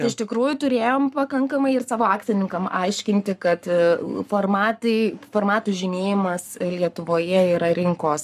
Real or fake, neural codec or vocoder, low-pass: fake; codec, 44.1 kHz, 7.8 kbps, Pupu-Codec; 14.4 kHz